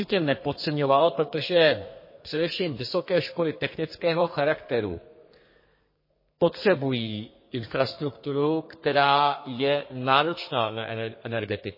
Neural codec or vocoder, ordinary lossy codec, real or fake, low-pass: codec, 32 kHz, 1.9 kbps, SNAC; MP3, 24 kbps; fake; 5.4 kHz